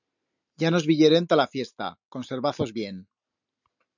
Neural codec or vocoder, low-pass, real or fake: none; 7.2 kHz; real